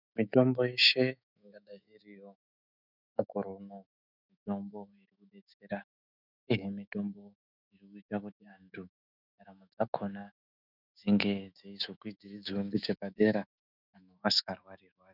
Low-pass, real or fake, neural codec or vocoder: 5.4 kHz; fake; autoencoder, 48 kHz, 128 numbers a frame, DAC-VAE, trained on Japanese speech